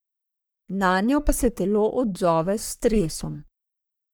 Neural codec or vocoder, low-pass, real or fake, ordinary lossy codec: codec, 44.1 kHz, 3.4 kbps, Pupu-Codec; none; fake; none